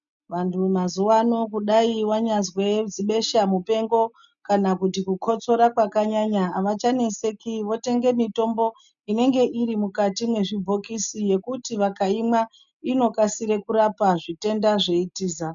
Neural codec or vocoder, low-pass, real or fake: none; 7.2 kHz; real